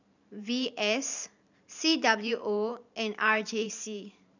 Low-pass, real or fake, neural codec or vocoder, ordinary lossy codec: 7.2 kHz; fake; vocoder, 44.1 kHz, 128 mel bands every 512 samples, BigVGAN v2; none